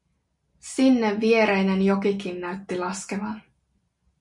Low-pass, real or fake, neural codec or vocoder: 10.8 kHz; real; none